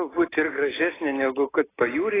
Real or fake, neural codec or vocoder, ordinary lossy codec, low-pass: real; none; AAC, 16 kbps; 3.6 kHz